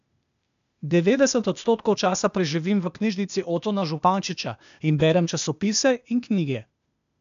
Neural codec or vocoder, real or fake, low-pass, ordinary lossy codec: codec, 16 kHz, 0.8 kbps, ZipCodec; fake; 7.2 kHz; AAC, 96 kbps